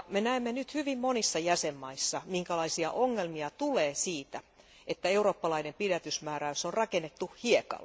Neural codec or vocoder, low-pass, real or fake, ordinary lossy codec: none; none; real; none